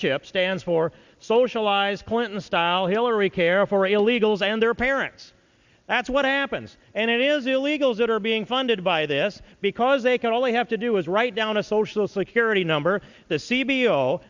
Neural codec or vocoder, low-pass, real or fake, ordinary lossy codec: none; 7.2 kHz; real; Opus, 64 kbps